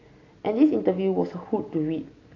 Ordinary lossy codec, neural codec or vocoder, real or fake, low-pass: AAC, 32 kbps; none; real; 7.2 kHz